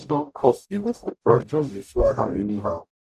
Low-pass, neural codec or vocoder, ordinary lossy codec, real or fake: 14.4 kHz; codec, 44.1 kHz, 0.9 kbps, DAC; none; fake